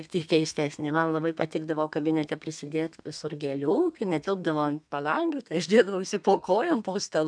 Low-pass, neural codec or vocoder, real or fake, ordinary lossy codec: 9.9 kHz; codec, 32 kHz, 1.9 kbps, SNAC; fake; MP3, 96 kbps